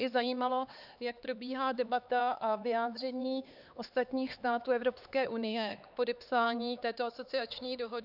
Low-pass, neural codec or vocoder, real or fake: 5.4 kHz; codec, 16 kHz, 4 kbps, X-Codec, HuBERT features, trained on LibriSpeech; fake